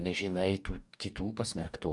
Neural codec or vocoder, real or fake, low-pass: codec, 44.1 kHz, 2.6 kbps, DAC; fake; 10.8 kHz